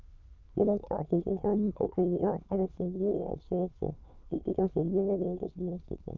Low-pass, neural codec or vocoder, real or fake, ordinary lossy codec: 7.2 kHz; autoencoder, 22.05 kHz, a latent of 192 numbers a frame, VITS, trained on many speakers; fake; Opus, 32 kbps